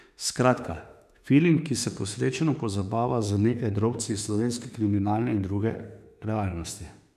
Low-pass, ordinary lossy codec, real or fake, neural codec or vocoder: 14.4 kHz; none; fake; autoencoder, 48 kHz, 32 numbers a frame, DAC-VAE, trained on Japanese speech